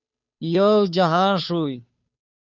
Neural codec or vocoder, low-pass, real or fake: codec, 16 kHz, 2 kbps, FunCodec, trained on Chinese and English, 25 frames a second; 7.2 kHz; fake